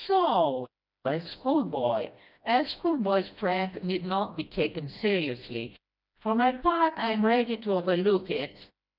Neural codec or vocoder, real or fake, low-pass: codec, 16 kHz, 1 kbps, FreqCodec, smaller model; fake; 5.4 kHz